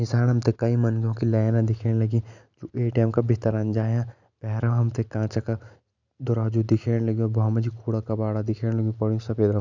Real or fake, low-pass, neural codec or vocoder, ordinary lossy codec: real; 7.2 kHz; none; none